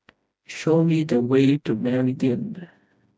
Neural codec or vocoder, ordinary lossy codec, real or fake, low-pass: codec, 16 kHz, 1 kbps, FreqCodec, smaller model; none; fake; none